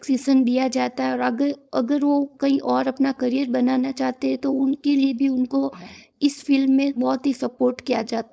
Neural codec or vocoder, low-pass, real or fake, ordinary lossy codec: codec, 16 kHz, 4.8 kbps, FACodec; none; fake; none